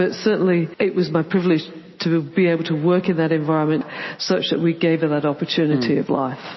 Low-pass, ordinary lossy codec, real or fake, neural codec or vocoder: 7.2 kHz; MP3, 24 kbps; real; none